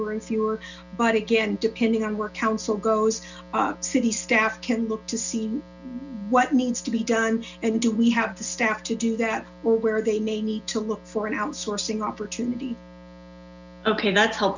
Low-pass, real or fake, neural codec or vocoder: 7.2 kHz; real; none